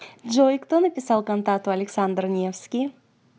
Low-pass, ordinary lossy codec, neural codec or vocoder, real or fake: none; none; none; real